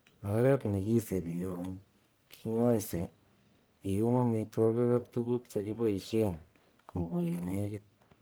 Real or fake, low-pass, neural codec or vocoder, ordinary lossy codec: fake; none; codec, 44.1 kHz, 1.7 kbps, Pupu-Codec; none